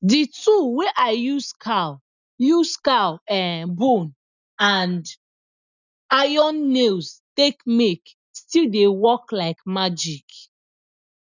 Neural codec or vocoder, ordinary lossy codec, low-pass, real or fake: none; none; 7.2 kHz; real